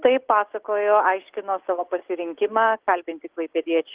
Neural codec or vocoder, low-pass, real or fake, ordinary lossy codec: none; 3.6 kHz; real; Opus, 16 kbps